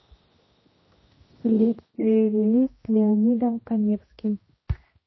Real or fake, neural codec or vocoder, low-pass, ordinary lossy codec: fake; codec, 16 kHz, 1 kbps, X-Codec, HuBERT features, trained on general audio; 7.2 kHz; MP3, 24 kbps